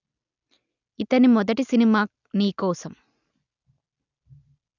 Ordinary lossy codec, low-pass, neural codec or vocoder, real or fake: none; 7.2 kHz; none; real